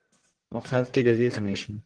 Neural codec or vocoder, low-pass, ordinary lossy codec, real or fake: codec, 44.1 kHz, 1.7 kbps, Pupu-Codec; 9.9 kHz; Opus, 16 kbps; fake